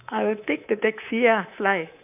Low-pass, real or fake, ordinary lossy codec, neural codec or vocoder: 3.6 kHz; fake; none; codec, 24 kHz, 3.1 kbps, DualCodec